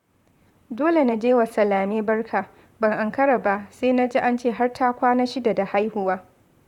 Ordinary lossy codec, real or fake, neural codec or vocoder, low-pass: none; fake; vocoder, 44.1 kHz, 128 mel bands, Pupu-Vocoder; 19.8 kHz